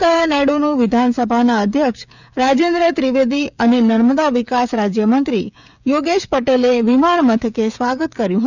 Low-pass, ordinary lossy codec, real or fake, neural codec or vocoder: 7.2 kHz; none; fake; codec, 16 kHz, 16 kbps, FreqCodec, smaller model